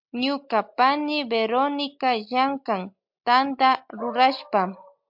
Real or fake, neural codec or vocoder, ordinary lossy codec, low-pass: real; none; MP3, 48 kbps; 5.4 kHz